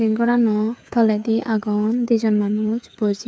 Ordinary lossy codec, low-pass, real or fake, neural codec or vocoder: none; none; fake; codec, 16 kHz, 8 kbps, FreqCodec, smaller model